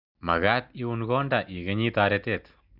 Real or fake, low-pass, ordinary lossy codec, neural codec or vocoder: real; 5.4 kHz; none; none